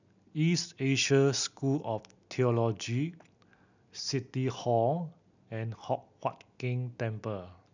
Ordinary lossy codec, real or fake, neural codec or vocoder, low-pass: none; real; none; 7.2 kHz